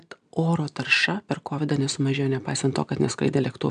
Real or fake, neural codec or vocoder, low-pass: real; none; 9.9 kHz